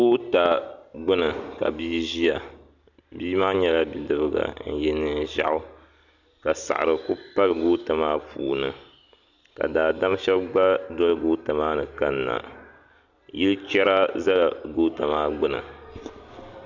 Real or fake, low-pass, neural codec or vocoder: real; 7.2 kHz; none